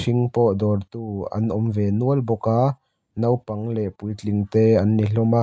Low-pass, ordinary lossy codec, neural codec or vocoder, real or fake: none; none; none; real